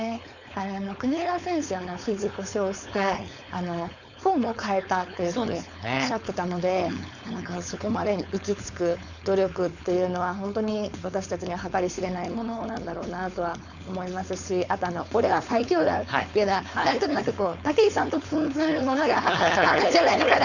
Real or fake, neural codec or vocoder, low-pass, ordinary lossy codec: fake; codec, 16 kHz, 4.8 kbps, FACodec; 7.2 kHz; none